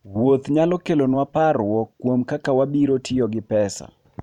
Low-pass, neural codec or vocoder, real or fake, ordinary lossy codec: 19.8 kHz; vocoder, 44.1 kHz, 128 mel bands every 512 samples, BigVGAN v2; fake; none